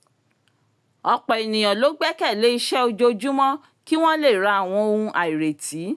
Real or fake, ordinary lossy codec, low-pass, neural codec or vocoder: real; none; none; none